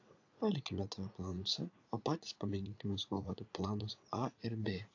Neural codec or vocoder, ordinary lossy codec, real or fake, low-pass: vocoder, 44.1 kHz, 80 mel bands, Vocos; AAC, 48 kbps; fake; 7.2 kHz